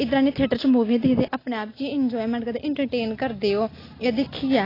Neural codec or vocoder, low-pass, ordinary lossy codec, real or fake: none; 5.4 kHz; AAC, 24 kbps; real